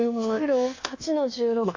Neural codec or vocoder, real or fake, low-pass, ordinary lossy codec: codec, 24 kHz, 1.2 kbps, DualCodec; fake; 7.2 kHz; MP3, 48 kbps